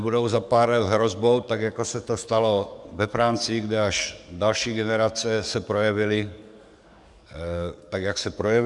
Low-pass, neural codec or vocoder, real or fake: 10.8 kHz; codec, 44.1 kHz, 7.8 kbps, DAC; fake